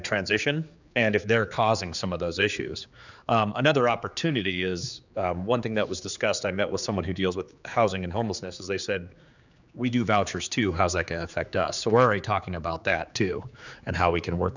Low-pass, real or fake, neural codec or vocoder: 7.2 kHz; fake; codec, 16 kHz, 4 kbps, X-Codec, HuBERT features, trained on general audio